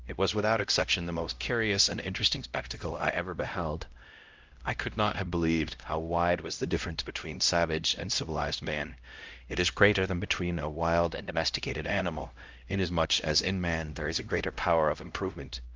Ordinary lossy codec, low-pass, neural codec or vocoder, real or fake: Opus, 24 kbps; 7.2 kHz; codec, 16 kHz, 0.5 kbps, X-Codec, HuBERT features, trained on LibriSpeech; fake